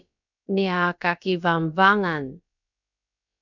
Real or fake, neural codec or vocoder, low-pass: fake; codec, 16 kHz, about 1 kbps, DyCAST, with the encoder's durations; 7.2 kHz